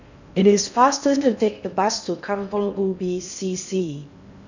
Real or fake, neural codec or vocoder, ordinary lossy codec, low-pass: fake; codec, 16 kHz in and 24 kHz out, 0.6 kbps, FocalCodec, streaming, 4096 codes; none; 7.2 kHz